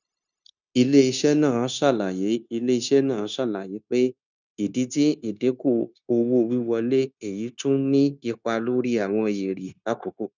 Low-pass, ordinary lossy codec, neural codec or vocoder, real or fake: 7.2 kHz; none; codec, 16 kHz, 0.9 kbps, LongCat-Audio-Codec; fake